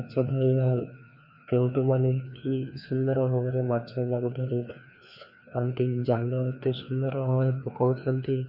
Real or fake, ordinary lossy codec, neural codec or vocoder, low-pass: fake; none; codec, 16 kHz, 2 kbps, FreqCodec, larger model; 5.4 kHz